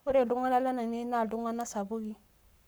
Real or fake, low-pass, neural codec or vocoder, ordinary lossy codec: fake; none; codec, 44.1 kHz, 7.8 kbps, Pupu-Codec; none